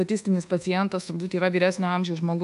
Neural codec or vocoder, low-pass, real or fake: codec, 24 kHz, 1.2 kbps, DualCodec; 10.8 kHz; fake